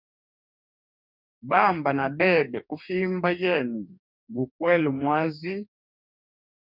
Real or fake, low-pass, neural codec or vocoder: fake; 5.4 kHz; codec, 44.1 kHz, 2.6 kbps, DAC